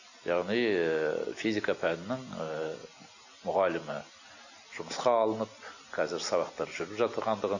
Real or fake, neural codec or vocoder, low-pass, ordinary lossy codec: real; none; 7.2 kHz; none